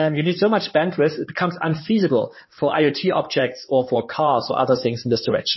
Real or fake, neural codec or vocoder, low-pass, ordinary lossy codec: fake; codec, 16 kHz in and 24 kHz out, 2.2 kbps, FireRedTTS-2 codec; 7.2 kHz; MP3, 24 kbps